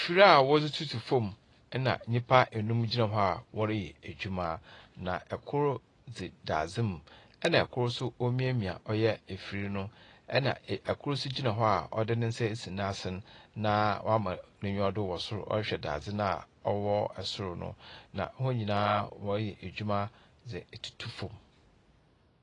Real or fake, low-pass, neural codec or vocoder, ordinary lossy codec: real; 10.8 kHz; none; AAC, 32 kbps